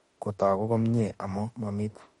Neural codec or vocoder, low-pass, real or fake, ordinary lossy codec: autoencoder, 48 kHz, 32 numbers a frame, DAC-VAE, trained on Japanese speech; 19.8 kHz; fake; MP3, 48 kbps